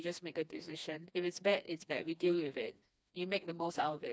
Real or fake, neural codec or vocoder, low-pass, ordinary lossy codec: fake; codec, 16 kHz, 2 kbps, FreqCodec, smaller model; none; none